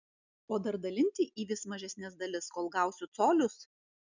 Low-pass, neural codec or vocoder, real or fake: 7.2 kHz; none; real